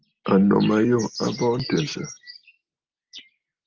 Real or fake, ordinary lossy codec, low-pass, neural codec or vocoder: real; Opus, 24 kbps; 7.2 kHz; none